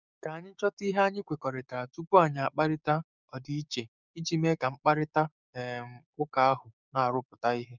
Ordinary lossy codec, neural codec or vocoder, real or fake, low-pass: none; codec, 16 kHz, 6 kbps, DAC; fake; 7.2 kHz